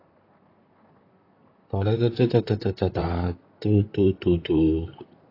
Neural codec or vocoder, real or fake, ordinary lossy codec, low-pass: vocoder, 44.1 kHz, 128 mel bands, Pupu-Vocoder; fake; AAC, 32 kbps; 5.4 kHz